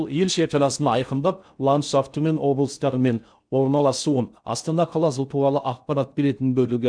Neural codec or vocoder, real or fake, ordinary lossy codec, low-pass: codec, 16 kHz in and 24 kHz out, 0.6 kbps, FocalCodec, streaming, 4096 codes; fake; none; 9.9 kHz